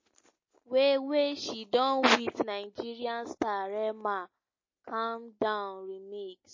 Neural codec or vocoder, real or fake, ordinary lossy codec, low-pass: none; real; MP3, 32 kbps; 7.2 kHz